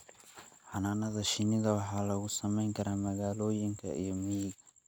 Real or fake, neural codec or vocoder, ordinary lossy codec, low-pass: real; none; none; none